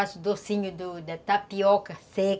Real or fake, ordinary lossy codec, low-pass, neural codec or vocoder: real; none; none; none